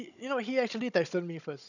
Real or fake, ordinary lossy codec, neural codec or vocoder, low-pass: fake; none; codec, 16 kHz, 16 kbps, FunCodec, trained on Chinese and English, 50 frames a second; 7.2 kHz